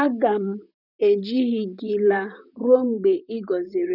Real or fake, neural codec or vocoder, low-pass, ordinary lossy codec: fake; vocoder, 44.1 kHz, 128 mel bands, Pupu-Vocoder; 5.4 kHz; none